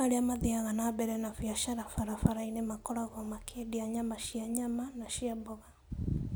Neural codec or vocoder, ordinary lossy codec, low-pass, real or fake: none; none; none; real